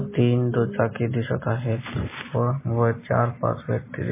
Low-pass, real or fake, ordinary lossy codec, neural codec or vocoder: 3.6 kHz; real; MP3, 16 kbps; none